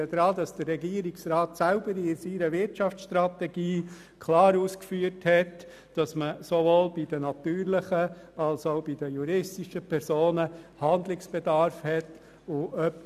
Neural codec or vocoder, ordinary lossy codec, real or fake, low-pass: none; none; real; 14.4 kHz